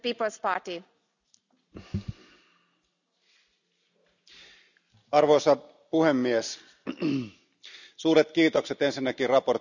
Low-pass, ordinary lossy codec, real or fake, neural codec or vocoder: 7.2 kHz; none; real; none